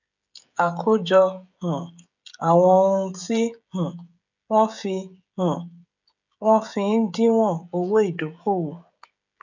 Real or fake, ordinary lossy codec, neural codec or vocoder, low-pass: fake; none; codec, 16 kHz, 16 kbps, FreqCodec, smaller model; 7.2 kHz